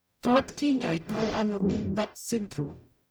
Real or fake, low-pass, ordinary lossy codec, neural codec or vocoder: fake; none; none; codec, 44.1 kHz, 0.9 kbps, DAC